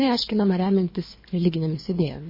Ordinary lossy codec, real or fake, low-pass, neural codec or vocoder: MP3, 24 kbps; fake; 5.4 kHz; codec, 24 kHz, 3 kbps, HILCodec